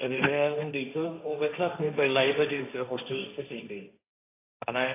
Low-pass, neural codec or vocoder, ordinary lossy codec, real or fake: 3.6 kHz; codec, 16 kHz, 1.1 kbps, Voila-Tokenizer; AAC, 24 kbps; fake